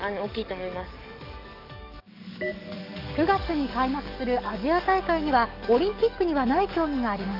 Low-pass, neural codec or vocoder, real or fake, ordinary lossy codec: 5.4 kHz; codec, 44.1 kHz, 7.8 kbps, DAC; fake; none